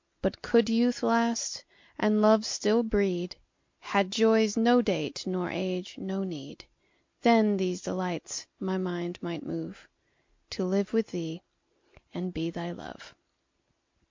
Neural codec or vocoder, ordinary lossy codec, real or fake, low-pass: none; MP3, 48 kbps; real; 7.2 kHz